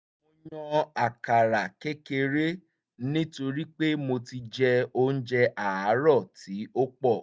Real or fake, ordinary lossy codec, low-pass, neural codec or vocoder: real; none; none; none